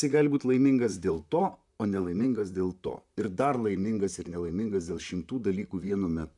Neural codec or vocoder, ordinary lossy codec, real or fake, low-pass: vocoder, 44.1 kHz, 128 mel bands, Pupu-Vocoder; MP3, 96 kbps; fake; 10.8 kHz